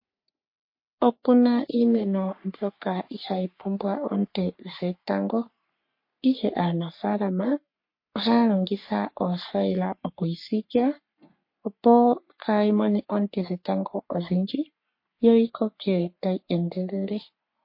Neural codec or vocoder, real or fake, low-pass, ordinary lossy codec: codec, 44.1 kHz, 3.4 kbps, Pupu-Codec; fake; 5.4 kHz; MP3, 32 kbps